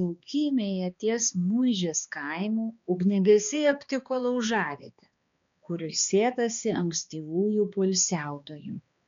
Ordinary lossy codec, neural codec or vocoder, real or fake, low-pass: MP3, 64 kbps; codec, 16 kHz, 2 kbps, X-Codec, HuBERT features, trained on balanced general audio; fake; 7.2 kHz